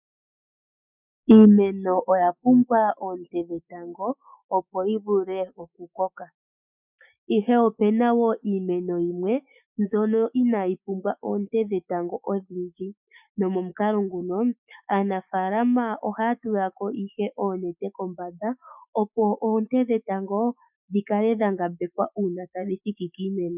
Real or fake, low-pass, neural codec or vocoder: fake; 3.6 kHz; autoencoder, 48 kHz, 128 numbers a frame, DAC-VAE, trained on Japanese speech